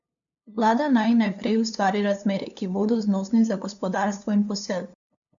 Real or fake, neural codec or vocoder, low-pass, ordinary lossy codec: fake; codec, 16 kHz, 2 kbps, FunCodec, trained on LibriTTS, 25 frames a second; 7.2 kHz; none